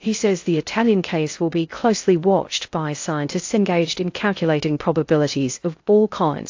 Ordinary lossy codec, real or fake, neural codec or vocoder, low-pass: AAC, 48 kbps; fake; codec, 16 kHz in and 24 kHz out, 0.6 kbps, FocalCodec, streaming, 2048 codes; 7.2 kHz